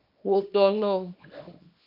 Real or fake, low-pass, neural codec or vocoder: fake; 5.4 kHz; codec, 24 kHz, 0.9 kbps, WavTokenizer, small release